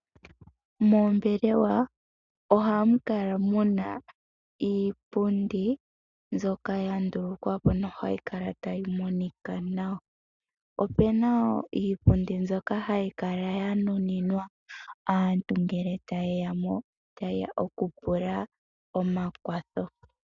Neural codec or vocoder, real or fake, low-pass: none; real; 7.2 kHz